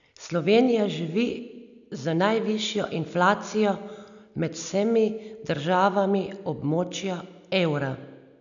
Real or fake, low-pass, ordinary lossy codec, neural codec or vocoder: real; 7.2 kHz; none; none